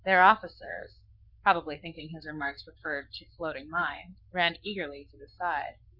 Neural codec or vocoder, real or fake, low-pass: codec, 44.1 kHz, 7.8 kbps, Pupu-Codec; fake; 5.4 kHz